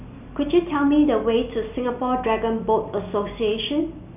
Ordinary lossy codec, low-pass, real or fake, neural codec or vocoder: none; 3.6 kHz; real; none